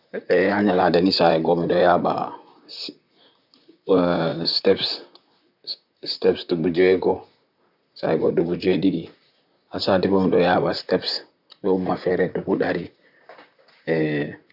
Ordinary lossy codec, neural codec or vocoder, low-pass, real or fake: none; vocoder, 44.1 kHz, 128 mel bands, Pupu-Vocoder; 5.4 kHz; fake